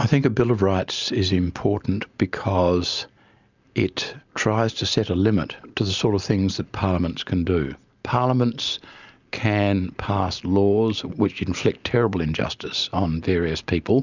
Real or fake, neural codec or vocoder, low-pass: real; none; 7.2 kHz